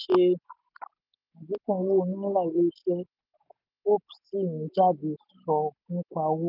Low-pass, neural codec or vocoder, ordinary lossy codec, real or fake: 5.4 kHz; none; none; real